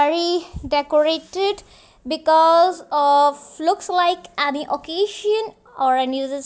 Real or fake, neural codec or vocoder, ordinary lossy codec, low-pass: real; none; none; none